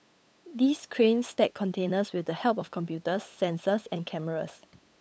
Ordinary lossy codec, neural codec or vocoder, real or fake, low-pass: none; codec, 16 kHz, 8 kbps, FunCodec, trained on LibriTTS, 25 frames a second; fake; none